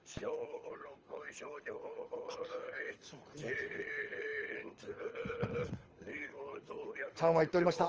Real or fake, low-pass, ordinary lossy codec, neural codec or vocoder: fake; 7.2 kHz; Opus, 24 kbps; codec, 24 kHz, 6 kbps, HILCodec